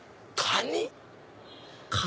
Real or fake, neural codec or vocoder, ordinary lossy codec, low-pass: real; none; none; none